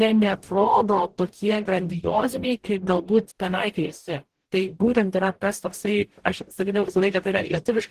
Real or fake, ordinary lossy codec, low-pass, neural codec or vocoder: fake; Opus, 16 kbps; 14.4 kHz; codec, 44.1 kHz, 0.9 kbps, DAC